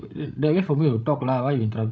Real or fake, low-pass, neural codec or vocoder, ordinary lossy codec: fake; none; codec, 16 kHz, 16 kbps, FreqCodec, larger model; none